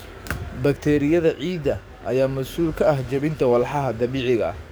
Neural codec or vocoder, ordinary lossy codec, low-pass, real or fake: codec, 44.1 kHz, 7.8 kbps, DAC; none; none; fake